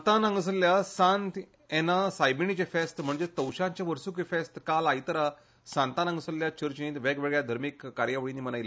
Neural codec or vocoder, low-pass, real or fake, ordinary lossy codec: none; none; real; none